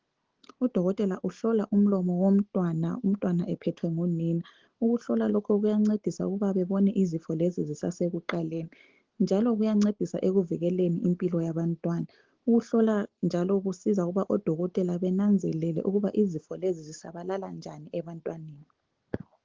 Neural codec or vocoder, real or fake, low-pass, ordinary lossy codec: none; real; 7.2 kHz; Opus, 16 kbps